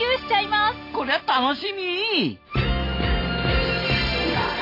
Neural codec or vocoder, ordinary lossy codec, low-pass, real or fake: none; AAC, 32 kbps; 5.4 kHz; real